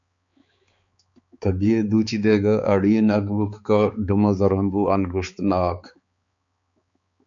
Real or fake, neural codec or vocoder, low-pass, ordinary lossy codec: fake; codec, 16 kHz, 4 kbps, X-Codec, HuBERT features, trained on balanced general audio; 7.2 kHz; MP3, 48 kbps